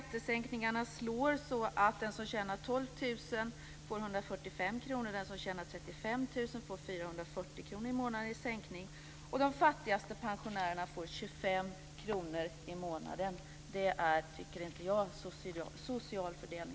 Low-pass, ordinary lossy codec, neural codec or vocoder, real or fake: none; none; none; real